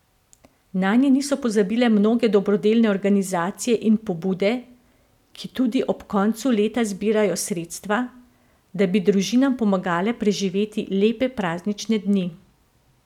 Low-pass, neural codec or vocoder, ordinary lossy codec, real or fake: 19.8 kHz; none; none; real